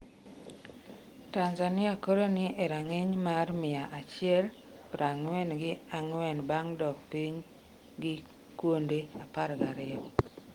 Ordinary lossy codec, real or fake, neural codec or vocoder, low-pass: Opus, 24 kbps; real; none; 19.8 kHz